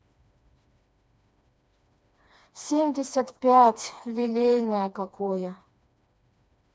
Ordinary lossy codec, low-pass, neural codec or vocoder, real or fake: none; none; codec, 16 kHz, 2 kbps, FreqCodec, smaller model; fake